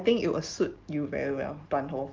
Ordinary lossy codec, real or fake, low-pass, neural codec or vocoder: Opus, 32 kbps; real; 7.2 kHz; none